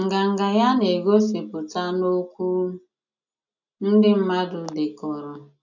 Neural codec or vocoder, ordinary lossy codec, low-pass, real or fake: none; none; 7.2 kHz; real